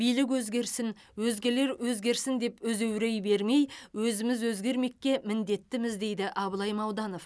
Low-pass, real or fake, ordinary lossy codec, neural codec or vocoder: none; real; none; none